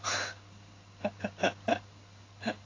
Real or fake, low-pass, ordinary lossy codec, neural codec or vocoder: fake; 7.2 kHz; AAC, 32 kbps; codec, 16 kHz in and 24 kHz out, 1 kbps, XY-Tokenizer